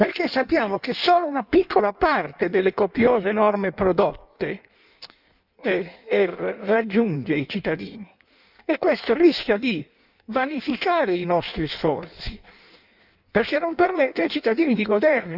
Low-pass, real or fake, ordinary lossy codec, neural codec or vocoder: 5.4 kHz; fake; none; codec, 16 kHz in and 24 kHz out, 1.1 kbps, FireRedTTS-2 codec